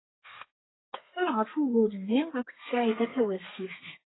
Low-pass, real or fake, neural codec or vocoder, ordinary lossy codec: 7.2 kHz; fake; codec, 32 kHz, 1.9 kbps, SNAC; AAC, 16 kbps